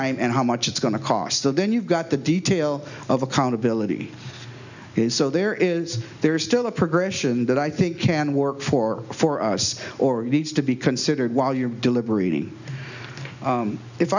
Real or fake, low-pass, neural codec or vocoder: real; 7.2 kHz; none